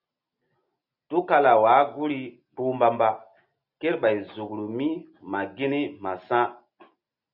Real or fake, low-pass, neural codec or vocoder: real; 5.4 kHz; none